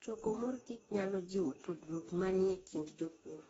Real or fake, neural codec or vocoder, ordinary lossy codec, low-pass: fake; codec, 44.1 kHz, 2.6 kbps, DAC; AAC, 24 kbps; 19.8 kHz